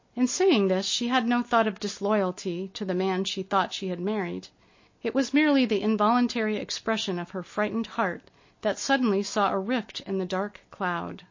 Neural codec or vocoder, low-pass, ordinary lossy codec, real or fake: none; 7.2 kHz; MP3, 32 kbps; real